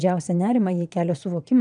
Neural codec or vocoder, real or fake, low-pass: vocoder, 22.05 kHz, 80 mel bands, Vocos; fake; 9.9 kHz